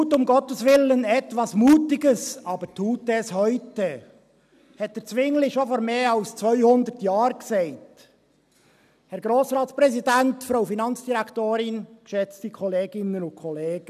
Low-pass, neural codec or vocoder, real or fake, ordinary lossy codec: 14.4 kHz; none; real; none